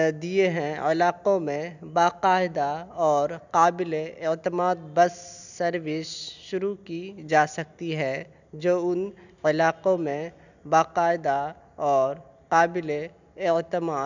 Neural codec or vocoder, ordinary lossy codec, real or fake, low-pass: none; none; real; 7.2 kHz